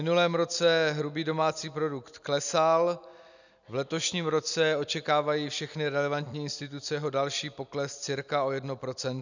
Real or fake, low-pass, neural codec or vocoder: real; 7.2 kHz; none